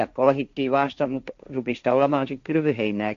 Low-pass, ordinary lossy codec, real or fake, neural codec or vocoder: 7.2 kHz; MP3, 64 kbps; fake; codec, 16 kHz, 1.1 kbps, Voila-Tokenizer